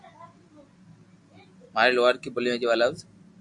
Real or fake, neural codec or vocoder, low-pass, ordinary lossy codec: real; none; 10.8 kHz; AAC, 64 kbps